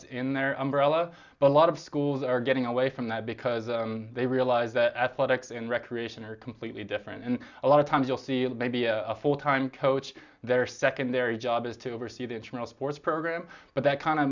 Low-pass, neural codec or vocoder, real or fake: 7.2 kHz; none; real